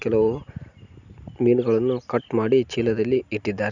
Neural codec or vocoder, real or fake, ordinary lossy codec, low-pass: none; real; none; 7.2 kHz